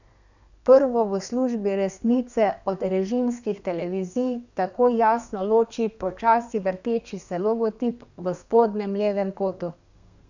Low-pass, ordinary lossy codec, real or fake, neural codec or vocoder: 7.2 kHz; none; fake; codec, 32 kHz, 1.9 kbps, SNAC